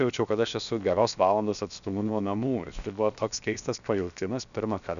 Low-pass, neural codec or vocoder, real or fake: 7.2 kHz; codec, 16 kHz, 0.7 kbps, FocalCodec; fake